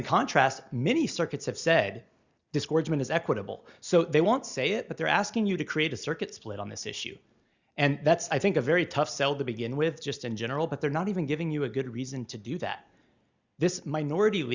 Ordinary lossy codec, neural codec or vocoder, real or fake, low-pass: Opus, 64 kbps; none; real; 7.2 kHz